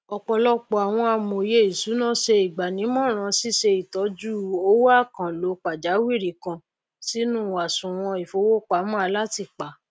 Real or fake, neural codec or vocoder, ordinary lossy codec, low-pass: real; none; none; none